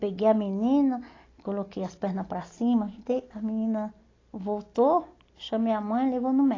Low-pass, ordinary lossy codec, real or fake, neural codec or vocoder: 7.2 kHz; AAC, 32 kbps; real; none